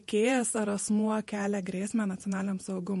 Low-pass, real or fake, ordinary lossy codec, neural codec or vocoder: 14.4 kHz; fake; MP3, 48 kbps; vocoder, 48 kHz, 128 mel bands, Vocos